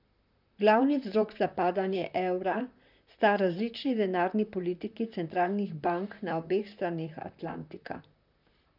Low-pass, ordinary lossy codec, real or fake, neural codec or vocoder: 5.4 kHz; none; fake; vocoder, 44.1 kHz, 128 mel bands, Pupu-Vocoder